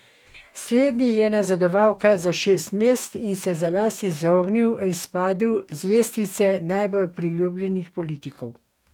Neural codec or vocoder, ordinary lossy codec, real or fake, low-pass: codec, 44.1 kHz, 2.6 kbps, DAC; none; fake; 19.8 kHz